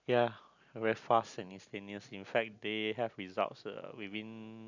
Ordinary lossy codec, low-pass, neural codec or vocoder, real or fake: none; 7.2 kHz; none; real